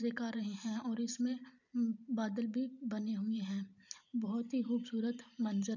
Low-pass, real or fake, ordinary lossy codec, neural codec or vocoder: 7.2 kHz; fake; none; vocoder, 44.1 kHz, 128 mel bands every 512 samples, BigVGAN v2